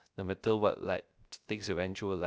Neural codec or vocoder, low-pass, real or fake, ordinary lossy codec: codec, 16 kHz, 0.3 kbps, FocalCodec; none; fake; none